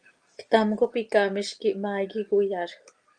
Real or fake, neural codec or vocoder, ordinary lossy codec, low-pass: real; none; Opus, 32 kbps; 9.9 kHz